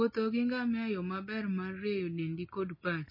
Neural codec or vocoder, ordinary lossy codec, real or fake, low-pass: none; MP3, 24 kbps; real; 5.4 kHz